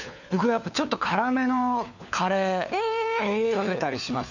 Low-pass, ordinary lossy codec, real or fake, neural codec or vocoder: 7.2 kHz; none; fake; codec, 16 kHz, 4 kbps, FunCodec, trained on LibriTTS, 50 frames a second